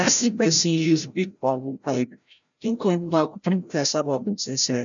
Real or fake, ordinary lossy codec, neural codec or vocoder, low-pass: fake; none; codec, 16 kHz, 0.5 kbps, FreqCodec, larger model; 7.2 kHz